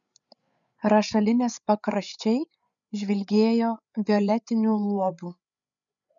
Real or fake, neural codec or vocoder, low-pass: fake; codec, 16 kHz, 8 kbps, FreqCodec, larger model; 7.2 kHz